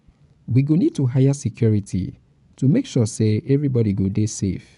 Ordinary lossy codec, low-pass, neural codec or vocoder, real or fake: none; 10.8 kHz; vocoder, 24 kHz, 100 mel bands, Vocos; fake